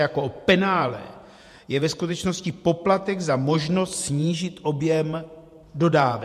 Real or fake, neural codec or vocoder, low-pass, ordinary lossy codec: fake; vocoder, 48 kHz, 128 mel bands, Vocos; 14.4 kHz; MP3, 64 kbps